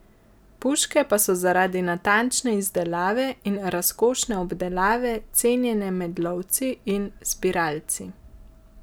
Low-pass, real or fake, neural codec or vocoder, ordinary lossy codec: none; real; none; none